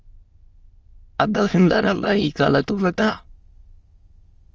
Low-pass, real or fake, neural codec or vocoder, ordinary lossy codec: 7.2 kHz; fake; autoencoder, 22.05 kHz, a latent of 192 numbers a frame, VITS, trained on many speakers; Opus, 24 kbps